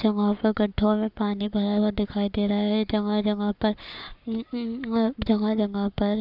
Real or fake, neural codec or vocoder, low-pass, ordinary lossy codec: fake; codec, 44.1 kHz, 7.8 kbps, Pupu-Codec; 5.4 kHz; none